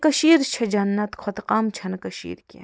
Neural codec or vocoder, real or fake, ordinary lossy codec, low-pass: none; real; none; none